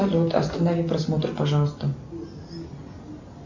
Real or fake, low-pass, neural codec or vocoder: real; 7.2 kHz; none